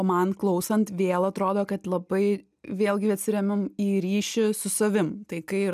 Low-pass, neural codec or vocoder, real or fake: 14.4 kHz; none; real